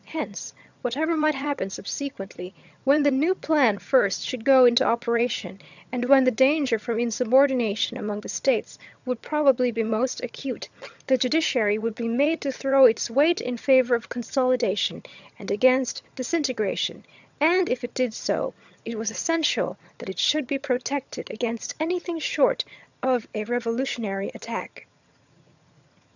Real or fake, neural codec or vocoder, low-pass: fake; vocoder, 22.05 kHz, 80 mel bands, HiFi-GAN; 7.2 kHz